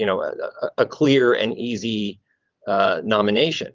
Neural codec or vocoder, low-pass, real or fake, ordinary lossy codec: vocoder, 22.05 kHz, 80 mel bands, WaveNeXt; 7.2 kHz; fake; Opus, 32 kbps